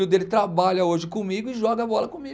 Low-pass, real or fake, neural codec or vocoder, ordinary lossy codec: none; real; none; none